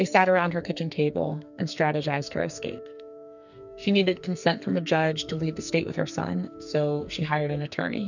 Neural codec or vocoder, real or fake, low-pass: codec, 44.1 kHz, 2.6 kbps, SNAC; fake; 7.2 kHz